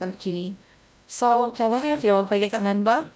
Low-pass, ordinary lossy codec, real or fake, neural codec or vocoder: none; none; fake; codec, 16 kHz, 0.5 kbps, FreqCodec, larger model